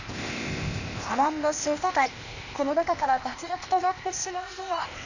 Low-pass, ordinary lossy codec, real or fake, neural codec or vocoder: 7.2 kHz; none; fake; codec, 16 kHz, 0.8 kbps, ZipCodec